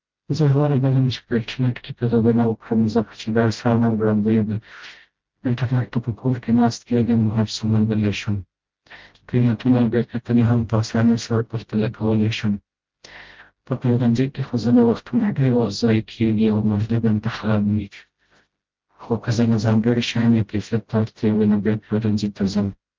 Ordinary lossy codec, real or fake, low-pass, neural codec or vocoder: Opus, 32 kbps; fake; 7.2 kHz; codec, 16 kHz, 0.5 kbps, FreqCodec, smaller model